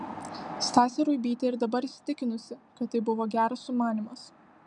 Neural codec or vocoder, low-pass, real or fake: none; 10.8 kHz; real